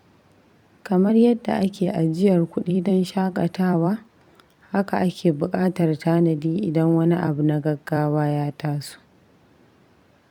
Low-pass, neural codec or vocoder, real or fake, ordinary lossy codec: 19.8 kHz; vocoder, 44.1 kHz, 128 mel bands every 256 samples, BigVGAN v2; fake; none